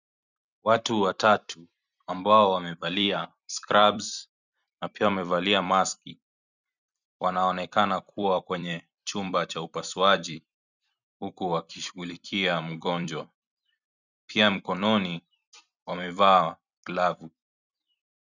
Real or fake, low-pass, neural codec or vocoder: fake; 7.2 kHz; vocoder, 44.1 kHz, 128 mel bands every 256 samples, BigVGAN v2